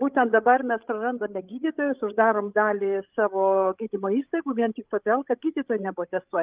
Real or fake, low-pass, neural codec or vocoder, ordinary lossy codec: fake; 3.6 kHz; codec, 16 kHz, 16 kbps, FreqCodec, larger model; Opus, 24 kbps